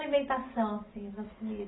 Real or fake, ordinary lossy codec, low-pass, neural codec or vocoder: real; AAC, 16 kbps; 7.2 kHz; none